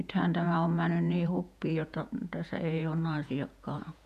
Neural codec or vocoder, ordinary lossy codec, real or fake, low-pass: vocoder, 48 kHz, 128 mel bands, Vocos; none; fake; 14.4 kHz